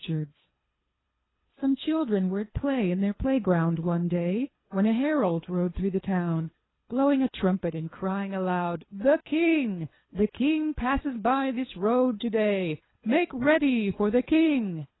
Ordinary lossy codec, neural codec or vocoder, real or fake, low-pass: AAC, 16 kbps; codec, 24 kHz, 3 kbps, HILCodec; fake; 7.2 kHz